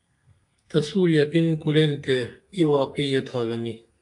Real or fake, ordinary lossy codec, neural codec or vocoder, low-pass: fake; AAC, 64 kbps; codec, 32 kHz, 1.9 kbps, SNAC; 10.8 kHz